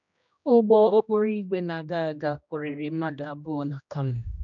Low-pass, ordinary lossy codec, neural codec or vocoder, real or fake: 7.2 kHz; none; codec, 16 kHz, 1 kbps, X-Codec, HuBERT features, trained on general audio; fake